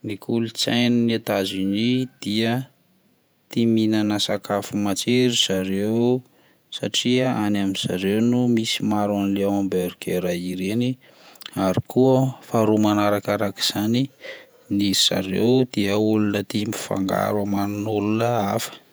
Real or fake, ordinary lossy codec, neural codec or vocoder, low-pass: fake; none; vocoder, 48 kHz, 128 mel bands, Vocos; none